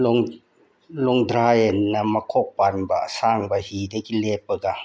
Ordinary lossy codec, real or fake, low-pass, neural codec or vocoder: none; real; none; none